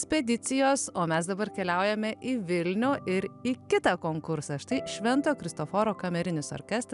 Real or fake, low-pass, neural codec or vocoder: real; 10.8 kHz; none